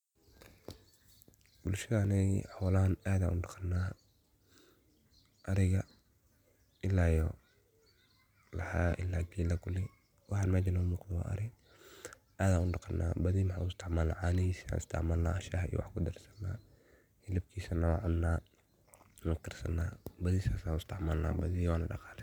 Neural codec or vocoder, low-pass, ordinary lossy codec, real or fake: none; 19.8 kHz; none; real